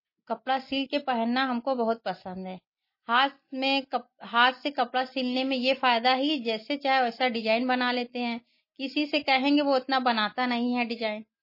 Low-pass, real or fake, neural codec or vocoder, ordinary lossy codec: 5.4 kHz; real; none; MP3, 24 kbps